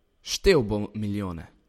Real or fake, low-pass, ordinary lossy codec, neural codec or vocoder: real; 19.8 kHz; MP3, 64 kbps; none